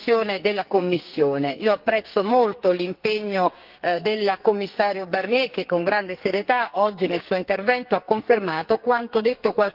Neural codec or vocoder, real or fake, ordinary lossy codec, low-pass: codec, 44.1 kHz, 2.6 kbps, SNAC; fake; Opus, 32 kbps; 5.4 kHz